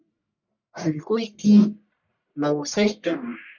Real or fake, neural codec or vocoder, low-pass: fake; codec, 44.1 kHz, 1.7 kbps, Pupu-Codec; 7.2 kHz